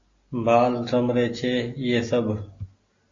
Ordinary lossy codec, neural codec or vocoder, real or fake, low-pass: AAC, 32 kbps; none; real; 7.2 kHz